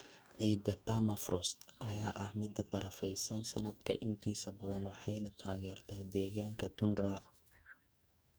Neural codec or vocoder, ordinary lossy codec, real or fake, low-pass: codec, 44.1 kHz, 2.6 kbps, DAC; none; fake; none